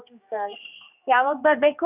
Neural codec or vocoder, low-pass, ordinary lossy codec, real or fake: codec, 16 kHz, 2 kbps, X-Codec, HuBERT features, trained on general audio; 3.6 kHz; none; fake